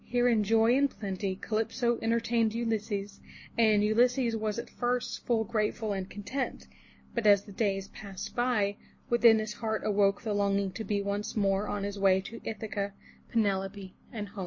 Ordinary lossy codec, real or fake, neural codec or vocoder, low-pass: MP3, 32 kbps; real; none; 7.2 kHz